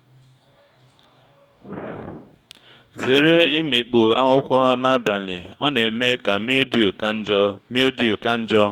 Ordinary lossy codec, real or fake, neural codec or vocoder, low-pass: Opus, 64 kbps; fake; codec, 44.1 kHz, 2.6 kbps, DAC; 19.8 kHz